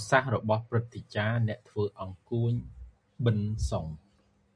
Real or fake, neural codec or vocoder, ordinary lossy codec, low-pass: real; none; MP3, 96 kbps; 9.9 kHz